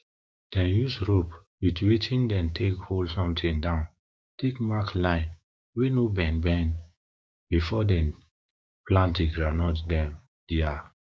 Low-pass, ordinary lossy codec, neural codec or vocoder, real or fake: none; none; codec, 16 kHz, 6 kbps, DAC; fake